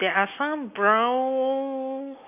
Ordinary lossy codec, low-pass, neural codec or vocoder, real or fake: none; 3.6 kHz; none; real